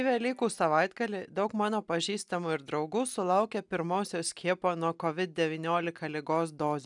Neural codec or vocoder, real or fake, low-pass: none; real; 10.8 kHz